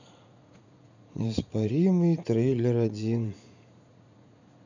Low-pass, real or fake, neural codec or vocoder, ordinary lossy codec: 7.2 kHz; fake; vocoder, 44.1 kHz, 80 mel bands, Vocos; none